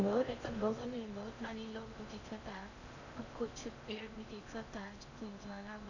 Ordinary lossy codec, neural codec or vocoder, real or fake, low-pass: none; codec, 16 kHz in and 24 kHz out, 0.6 kbps, FocalCodec, streaming, 4096 codes; fake; 7.2 kHz